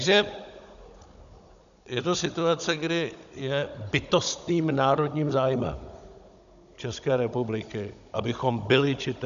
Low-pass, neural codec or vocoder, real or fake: 7.2 kHz; codec, 16 kHz, 16 kbps, FunCodec, trained on Chinese and English, 50 frames a second; fake